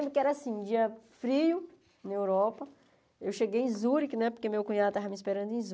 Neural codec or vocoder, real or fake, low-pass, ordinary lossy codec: none; real; none; none